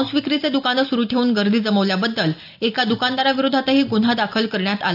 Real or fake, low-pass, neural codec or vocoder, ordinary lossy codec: real; 5.4 kHz; none; none